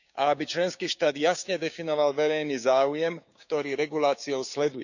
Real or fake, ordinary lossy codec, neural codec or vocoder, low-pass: fake; none; codec, 16 kHz, 6 kbps, DAC; 7.2 kHz